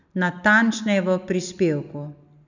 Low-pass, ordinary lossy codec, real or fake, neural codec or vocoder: 7.2 kHz; none; real; none